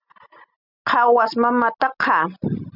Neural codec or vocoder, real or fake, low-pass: none; real; 5.4 kHz